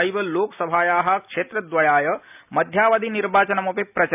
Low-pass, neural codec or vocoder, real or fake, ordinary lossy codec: 3.6 kHz; none; real; none